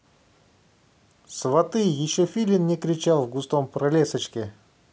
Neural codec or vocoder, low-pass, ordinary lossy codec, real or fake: none; none; none; real